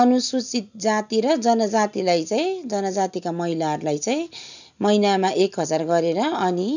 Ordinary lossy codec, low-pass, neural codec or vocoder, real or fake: none; 7.2 kHz; none; real